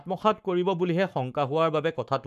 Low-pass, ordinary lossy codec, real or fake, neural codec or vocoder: 14.4 kHz; none; fake; codec, 44.1 kHz, 7.8 kbps, Pupu-Codec